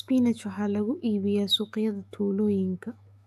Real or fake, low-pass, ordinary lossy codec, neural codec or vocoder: fake; 14.4 kHz; AAC, 96 kbps; autoencoder, 48 kHz, 128 numbers a frame, DAC-VAE, trained on Japanese speech